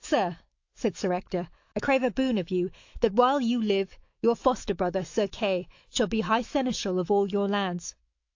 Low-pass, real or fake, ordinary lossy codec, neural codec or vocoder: 7.2 kHz; fake; AAC, 48 kbps; autoencoder, 48 kHz, 128 numbers a frame, DAC-VAE, trained on Japanese speech